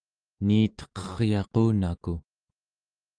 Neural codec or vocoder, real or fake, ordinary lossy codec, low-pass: autoencoder, 48 kHz, 128 numbers a frame, DAC-VAE, trained on Japanese speech; fake; Opus, 24 kbps; 9.9 kHz